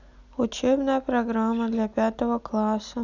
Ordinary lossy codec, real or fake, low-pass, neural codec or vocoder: none; real; 7.2 kHz; none